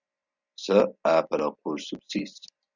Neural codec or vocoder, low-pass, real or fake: none; 7.2 kHz; real